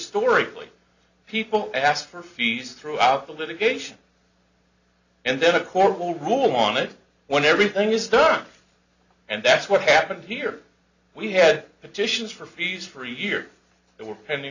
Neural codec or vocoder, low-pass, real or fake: none; 7.2 kHz; real